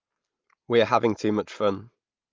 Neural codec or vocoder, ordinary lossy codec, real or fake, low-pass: none; Opus, 24 kbps; real; 7.2 kHz